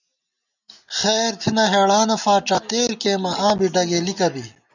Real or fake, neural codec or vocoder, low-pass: real; none; 7.2 kHz